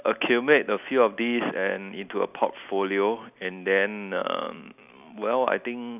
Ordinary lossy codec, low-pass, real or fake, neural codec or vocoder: none; 3.6 kHz; real; none